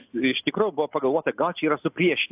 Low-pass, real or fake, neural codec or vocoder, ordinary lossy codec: 3.6 kHz; real; none; AAC, 32 kbps